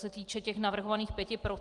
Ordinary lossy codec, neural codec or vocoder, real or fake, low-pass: Opus, 24 kbps; none; real; 10.8 kHz